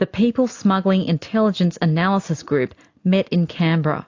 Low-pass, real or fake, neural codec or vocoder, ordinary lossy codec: 7.2 kHz; real; none; AAC, 48 kbps